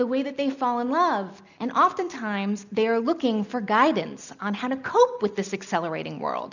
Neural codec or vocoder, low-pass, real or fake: none; 7.2 kHz; real